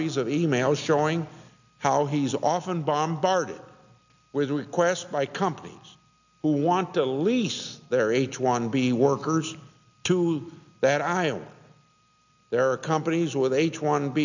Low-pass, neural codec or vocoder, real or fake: 7.2 kHz; none; real